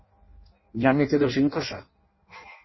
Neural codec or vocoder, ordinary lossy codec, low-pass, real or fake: codec, 16 kHz in and 24 kHz out, 0.6 kbps, FireRedTTS-2 codec; MP3, 24 kbps; 7.2 kHz; fake